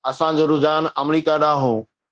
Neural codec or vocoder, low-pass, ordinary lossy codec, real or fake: codec, 24 kHz, 0.9 kbps, DualCodec; 9.9 kHz; Opus, 16 kbps; fake